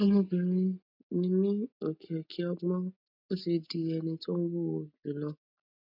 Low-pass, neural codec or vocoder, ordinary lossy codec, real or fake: 5.4 kHz; none; MP3, 48 kbps; real